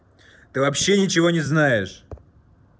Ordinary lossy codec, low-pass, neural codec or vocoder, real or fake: none; none; none; real